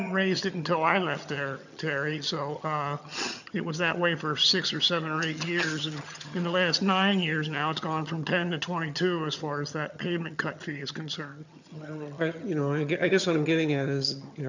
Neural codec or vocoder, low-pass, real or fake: vocoder, 22.05 kHz, 80 mel bands, HiFi-GAN; 7.2 kHz; fake